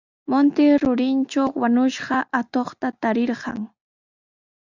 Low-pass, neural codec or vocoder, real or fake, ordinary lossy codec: 7.2 kHz; none; real; Opus, 64 kbps